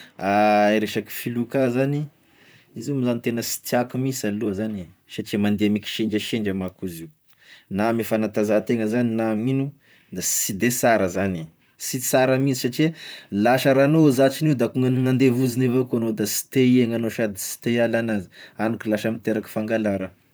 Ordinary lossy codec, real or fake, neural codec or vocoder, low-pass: none; fake; vocoder, 44.1 kHz, 128 mel bands, Pupu-Vocoder; none